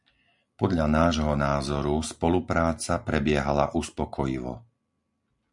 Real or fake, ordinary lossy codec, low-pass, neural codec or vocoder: real; MP3, 96 kbps; 10.8 kHz; none